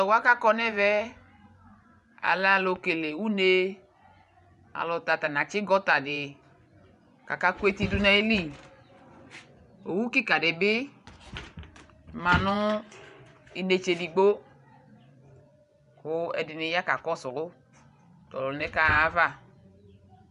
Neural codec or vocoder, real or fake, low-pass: vocoder, 24 kHz, 100 mel bands, Vocos; fake; 10.8 kHz